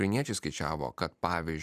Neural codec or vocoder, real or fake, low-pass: vocoder, 44.1 kHz, 128 mel bands every 256 samples, BigVGAN v2; fake; 14.4 kHz